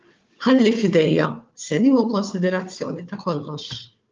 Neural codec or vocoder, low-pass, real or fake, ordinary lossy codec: codec, 16 kHz, 4 kbps, FunCodec, trained on Chinese and English, 50 frames a second; 7.2 kHz; fake; Opus, 24 kbps